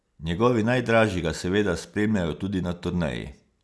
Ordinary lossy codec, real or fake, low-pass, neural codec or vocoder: none; real; none; none